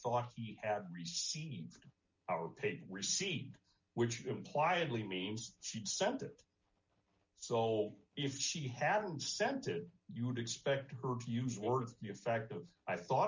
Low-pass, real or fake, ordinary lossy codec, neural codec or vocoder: 7.2 kHz; real; MP3, 64 kbps; none